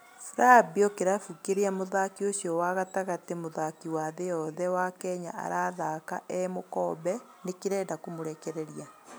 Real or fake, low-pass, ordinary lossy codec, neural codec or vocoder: real; none; none; none